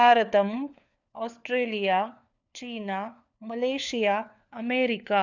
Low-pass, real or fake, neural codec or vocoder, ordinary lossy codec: 7.2 kHz; fake; codec, 16 kHz, 4 kbps, FunCodec, trained on LibriTTS, 50 frames a second; none